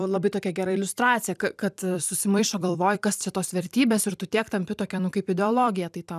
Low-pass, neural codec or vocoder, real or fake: 14.4 kHz; vocoder, 44.1 kHz, 128 mel bands every 256 samples, BigVGAN v2; fake